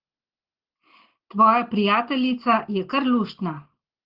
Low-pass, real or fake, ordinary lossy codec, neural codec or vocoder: 5.4 kHz; real; Opus, 16 kbps; none